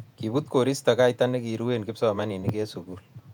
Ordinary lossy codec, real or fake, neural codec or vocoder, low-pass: none; fake; vocoder, 48 kHz, 128 mel bands, Vocos; 19.8 kHz